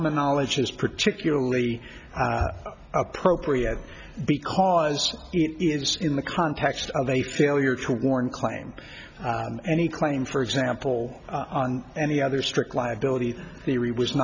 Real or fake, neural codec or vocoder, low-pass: real; none; 7.2 kHz